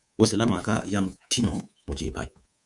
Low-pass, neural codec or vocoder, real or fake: 10.8 kHz; codec, 24 kHz, 3.1 kbps, DualCodec; fake